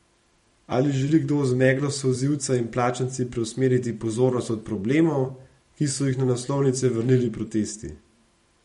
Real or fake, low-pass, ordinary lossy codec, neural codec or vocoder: fake; 19.8 kHz; MP3, 48 kbps; vocoder, 44.1 kHz, 128 mel bands every 512 samples, BigVGAN v2